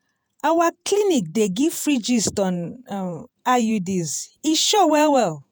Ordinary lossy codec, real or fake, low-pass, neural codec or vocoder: none; fake; none; vocoder, 48 kHz, 128 mel bands, Vocos